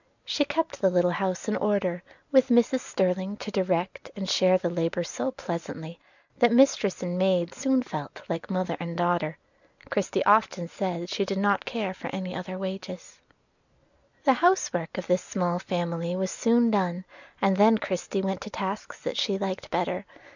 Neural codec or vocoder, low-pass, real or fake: none; 7.2 kHz; real